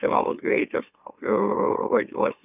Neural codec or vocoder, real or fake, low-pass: autoencoder, 44.1 kHz, a latent of 192 numbers a frame, MeloTTS; fake; 3.6 kHz